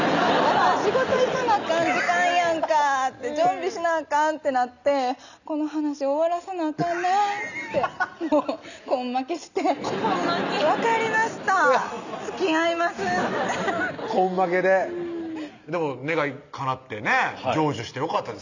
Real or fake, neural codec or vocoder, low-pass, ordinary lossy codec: real; none; 7.2 kHz; none